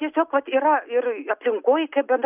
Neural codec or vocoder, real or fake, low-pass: none; real; 3.6 kHz